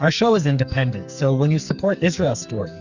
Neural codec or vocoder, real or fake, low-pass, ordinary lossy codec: codec, 44.1 kHz, 2.6 kbps, SNAC; fake; 7.2 kHz; Opus, 64 kbps